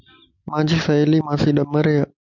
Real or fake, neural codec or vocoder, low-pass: real; none; 7.2 kHz